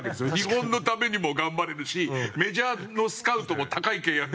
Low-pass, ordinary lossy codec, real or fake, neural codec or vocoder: none; none; real; none